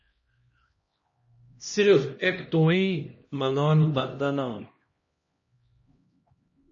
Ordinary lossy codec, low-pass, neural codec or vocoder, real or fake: MP3, 32 kbps; 7.2 kHz; codec, 16 kHz, 1 kbps, X-Codec, HuBERT features, trained on LibriSpeech; fake